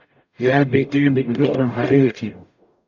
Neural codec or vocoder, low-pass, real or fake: codec, 44.1 kHz, 0.9 kbps, DAC; 7.2 kHz; fake